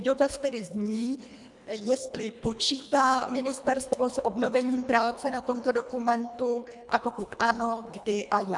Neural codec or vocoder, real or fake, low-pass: codec, 24 kHz, 1.5 kbps, HILCodec; fake; 10.8 kHz